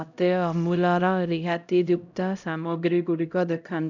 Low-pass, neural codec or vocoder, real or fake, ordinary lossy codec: 7.2 kHz; codec, 16 kHz, 0.5 kbps, X-Codec, HuBERT features, trained on LibriSpeech; fake; none